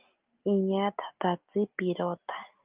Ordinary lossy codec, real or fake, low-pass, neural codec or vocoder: Opus, 24 kbps; real; 3.6 kHz; none